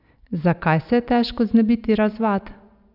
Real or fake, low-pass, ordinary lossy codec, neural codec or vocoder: real; 5.4 kHz; none; none